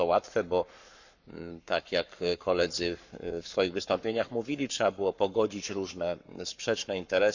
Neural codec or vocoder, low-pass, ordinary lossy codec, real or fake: codec, 44.1 kHz, 7.8 kbps, Pupu-Codec; 7.2 kHz; none; fake